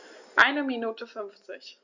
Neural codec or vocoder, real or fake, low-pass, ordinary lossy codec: none; real; 7.2 kHz; Opus, 64 kbps